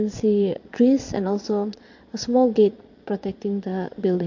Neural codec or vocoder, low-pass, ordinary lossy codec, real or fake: vocoder, 22.05 kHz, 80 mel bands, Vocos; 7.2 kHz; MP3, 48 kbps; fake